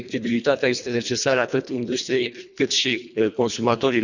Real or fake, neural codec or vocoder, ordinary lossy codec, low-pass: fake; codec, 24 kHz, 1.5 kbps, HILCodec; none; 7.2 kHz